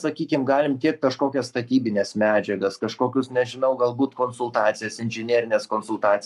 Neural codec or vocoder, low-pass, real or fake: codec, 44.1 kHz, 7.8 kbps, Pupu-Codec; 14.4 kHz; fake